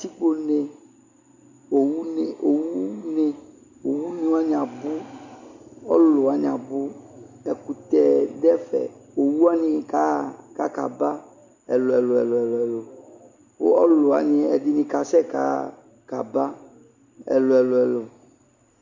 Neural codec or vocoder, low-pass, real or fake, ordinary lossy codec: none; 7.2 kHz; real; Opus, 64 kbps